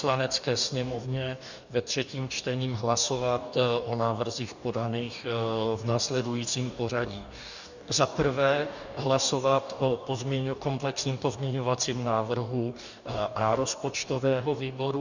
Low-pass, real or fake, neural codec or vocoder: 7.2 kHz; fake; codec, 44.1 kHz, 2.6 kbps, DAC